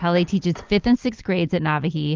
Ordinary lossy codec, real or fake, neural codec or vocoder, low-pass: Opus, 32 kbps; real; none; 7.2 kHz